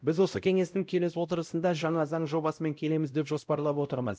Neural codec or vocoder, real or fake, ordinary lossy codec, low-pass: codec, 16 kHz, 0.5 kbps, X-Codec, WavLM features, trained on Multilingual LibriSpeech; fake; none; none